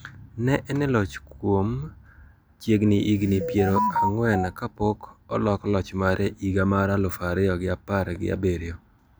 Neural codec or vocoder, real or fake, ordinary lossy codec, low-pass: none; real; none; none